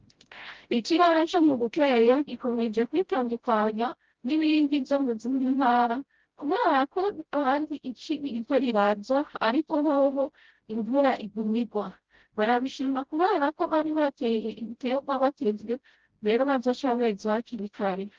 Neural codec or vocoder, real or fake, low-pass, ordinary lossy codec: codec, 16 kHz, 0.5 kbps, FreqCodec, smaller model; fake; 7.2 kHz; Opus, 16 kbps